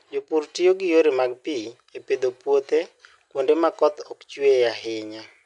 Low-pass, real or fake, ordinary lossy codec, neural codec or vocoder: 10.8 kHz; real; MP3, 64 kbps; none